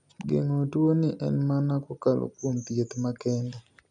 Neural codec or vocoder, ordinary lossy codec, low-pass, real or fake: none; none; 10.8 kHz; real